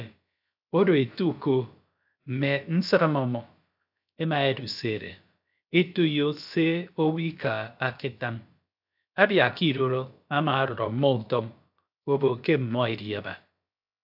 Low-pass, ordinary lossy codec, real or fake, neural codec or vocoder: 5.4 kHz; AAC, 48 kbps; fake; codec, 16 kHz, about 1 kbps, DyCAST, with the encoder's durations